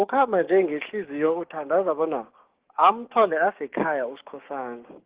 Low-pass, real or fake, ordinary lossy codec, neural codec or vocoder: 3.6 kHz; fake; Opus, 16 kbps; codec, 16 kHz, 6 kbps, DAC